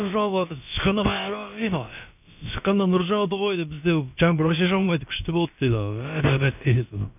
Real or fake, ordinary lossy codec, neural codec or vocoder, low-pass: fake; none; codec, 16 kHz, about 1 kbps, DyCAST, with the encoder's durations; 3.6 kHz